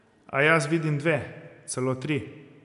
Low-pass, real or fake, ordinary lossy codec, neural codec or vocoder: 10.8 kHz; real; none; none